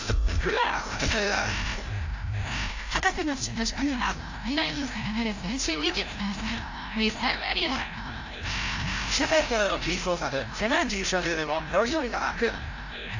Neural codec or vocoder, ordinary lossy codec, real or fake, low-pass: codec, 16 kHz, 0.5 kbps, FreqCodec, larger model; AAC, 48 kbps; fake; 7.2 kHz